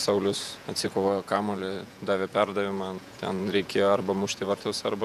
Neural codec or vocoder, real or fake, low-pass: vocoder, 48 kHz, 128 mel bands, Vocos; fake; 14.4 kHz